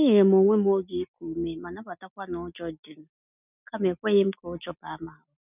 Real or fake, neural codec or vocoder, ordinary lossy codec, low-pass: real; none; none; 3.6 kHz